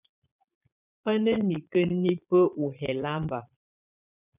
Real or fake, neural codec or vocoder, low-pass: fake; vocoder, 22.05 kHz, 80 mel bands, Vocos; 3.6 kHz